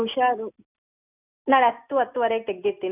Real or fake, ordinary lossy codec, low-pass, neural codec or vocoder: real; none; 3.6 kHz; none